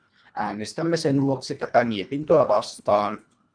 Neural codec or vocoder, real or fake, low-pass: codec, 24 kHz, 1.5 kbps, HILCodec; fake; 9.9 kHz